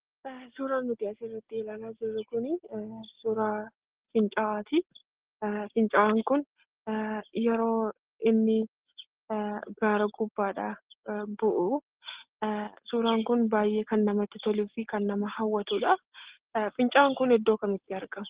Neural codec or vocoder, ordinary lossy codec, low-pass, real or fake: none; Opus, 16 kbps; 3.6 kHz; real